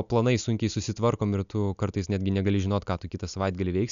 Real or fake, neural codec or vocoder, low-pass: real; none; 7.2 kHz